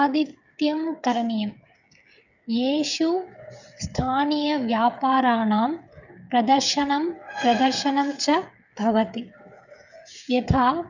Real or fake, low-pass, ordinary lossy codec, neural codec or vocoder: fake; 7.2 kHz; none; codec, 16 kHz, 8 kbps, FreqCodec, smaller model